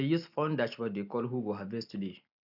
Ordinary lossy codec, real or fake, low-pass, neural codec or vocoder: none; real; 5.4 kHz; none